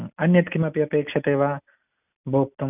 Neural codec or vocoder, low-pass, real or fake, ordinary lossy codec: none; 3.6 kHz; real; none